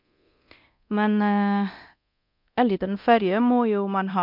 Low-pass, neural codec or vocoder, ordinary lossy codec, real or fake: 5.4 kHz; codec, 24 kHz, 0.9 kbps, DualCodec; MP3, 48 kbps; fake